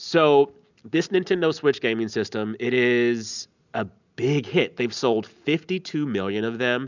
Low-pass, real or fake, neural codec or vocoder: 7.2 kHz; real; none